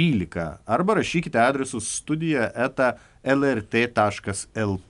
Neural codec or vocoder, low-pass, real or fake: none; 10.8 kHz; real